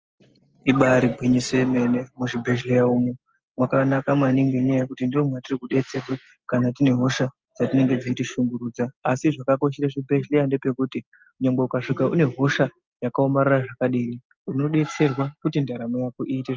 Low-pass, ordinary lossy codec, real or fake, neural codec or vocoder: 7.2 kHz; Opus, 24 kbps; real; none